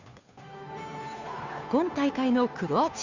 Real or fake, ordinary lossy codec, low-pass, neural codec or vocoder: fake; Opus, 64 kbps; 7.2 kHz; codec, 16 kHz, 2 kbps, FunCodec, trained on Chinese and English, 25 frames a second